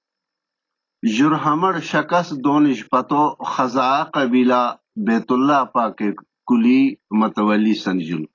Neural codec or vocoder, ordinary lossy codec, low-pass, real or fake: none; AAC, 32 kbps; 7.2 kHz; real